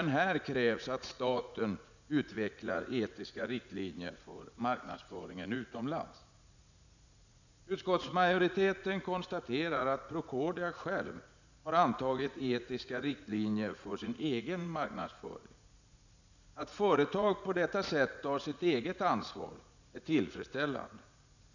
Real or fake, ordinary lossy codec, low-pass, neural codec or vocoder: fake; none; 7.2 kHz; vocoder, 44.1 kHz, 80 mel bands, Vocos